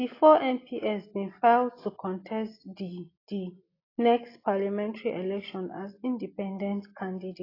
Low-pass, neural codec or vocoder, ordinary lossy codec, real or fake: 5.4 kHz; none; AAC, 24 kbps; real